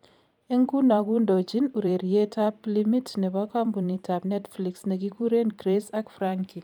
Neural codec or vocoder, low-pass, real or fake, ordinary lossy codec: vocoder, 44.1 kHz, 128 mel bands every 512 samples, BigVGAN v2; 19.8 kHz; fake; none